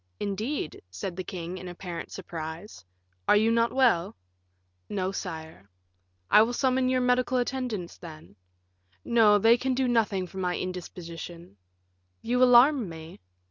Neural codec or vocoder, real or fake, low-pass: none; real; 7.2 kHz